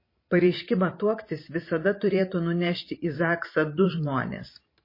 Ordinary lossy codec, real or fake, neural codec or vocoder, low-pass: MP3, 24 kbps; fake; vocoder, 44.1 kHz, 128 mel bands every 512 samples, BigVGAN v2; 5.4 kHz